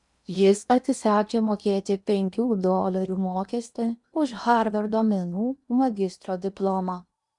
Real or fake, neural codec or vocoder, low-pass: fake; codec, 16 kHz in and 24 kHz out, 0.8 kbps, FocalCodec, streaming, 65536 codes; 10.8 kHz